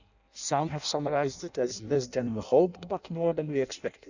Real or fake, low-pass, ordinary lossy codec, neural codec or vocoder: fake; 7.2 kHz; none; codec, 16 kHz in and 24 kHz out, 0.6 kbps, FireRedTTS-2 codec